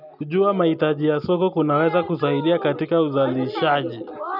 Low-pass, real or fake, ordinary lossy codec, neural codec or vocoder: 5.4 kHz; real; none; none